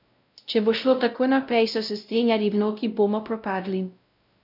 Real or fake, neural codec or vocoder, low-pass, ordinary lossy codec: fake; codec, 16 kHz, 0.5 kbps, X-Codec, WavLM features, trained on Multilingual LibriSpeech; 5.4 kHz; none